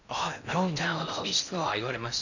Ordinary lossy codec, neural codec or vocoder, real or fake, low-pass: none; codec, 16 kHz in and 24 kHz out, 0.6 kbps, FocalCodec, streaming, 4096 codes; fake; 7.2 kHz